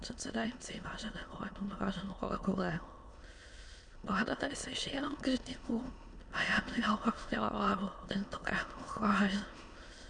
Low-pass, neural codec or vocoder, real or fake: 9.9 kHz; autoencoder, 22.05 kHz, a latent of 192 numbers a frame, VITS, trained on many speakers; fake